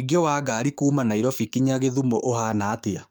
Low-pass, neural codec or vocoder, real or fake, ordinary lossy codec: none; codec, 44.1 kHz, 7.8 kbps, DAC; fake; none